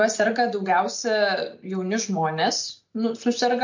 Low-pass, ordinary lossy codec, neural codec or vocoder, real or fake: 7.2 kHz; MP3, 48 kbps; none; real